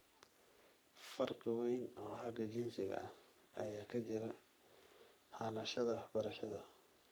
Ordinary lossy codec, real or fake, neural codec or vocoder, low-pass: none; fake; codec, 44.1 kHz, 3.4 kbps, Pupu-Codec; none